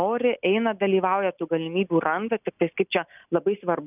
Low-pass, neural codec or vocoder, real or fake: 3.6 kHz; none; real